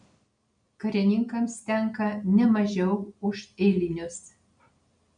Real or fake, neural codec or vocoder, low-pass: real; none; 9.9 kHz